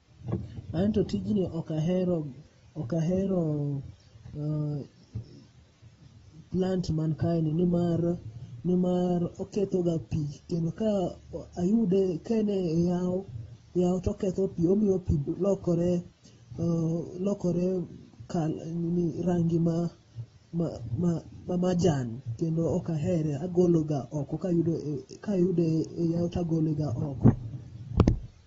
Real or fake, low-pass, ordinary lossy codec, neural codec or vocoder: real; 10.8 kHz; AAC, 24 kbps; none